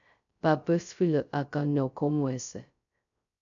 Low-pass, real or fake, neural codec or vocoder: 7.2 kHz; fake; codec, 16 kHz, 0.2 kbps, FocalCodec